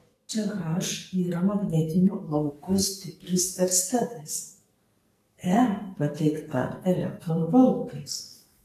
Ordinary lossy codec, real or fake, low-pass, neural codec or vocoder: AAC, 48 kbps; fake; 14.4 kHz; codec, 44.1 kHz, 2.6 kbps, SNAC